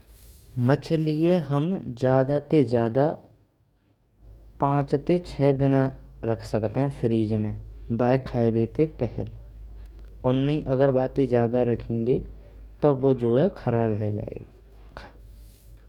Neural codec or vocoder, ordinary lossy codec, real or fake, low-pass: codec, 44.1 kHz, 2.6 kbps, DAC; none; fake; 19.8 kHz